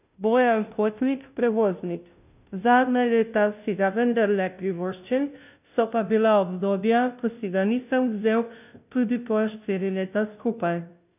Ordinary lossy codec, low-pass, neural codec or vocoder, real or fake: none; 3.6 kHz; codec, 16 kHz, 0.5 kbps, FunCodec, trained on Chinese and English, 25 frames a second; fake